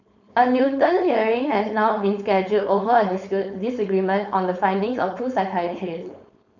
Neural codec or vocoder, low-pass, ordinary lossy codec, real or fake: codec, 16 kHz, 4.8 kbps, FACodec; 7.2 kHz; none; fake